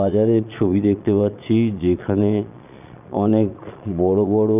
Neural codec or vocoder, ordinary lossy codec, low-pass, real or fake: vocoder, 22.05 kHz, 80 mel bands, Vocos; none; 3.6 kHz; fake